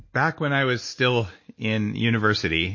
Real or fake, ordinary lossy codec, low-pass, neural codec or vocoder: real; MP3, 32 kbps; 7.2 kHz; none